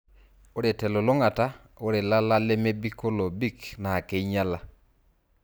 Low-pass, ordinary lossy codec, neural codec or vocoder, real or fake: none; none; none; real